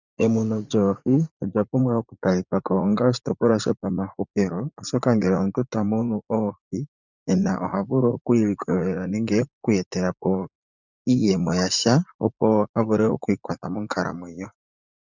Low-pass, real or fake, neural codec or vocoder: 7.2 kHz; fake; vocoder, 44.1 kHz, 80 mel bands, Vocos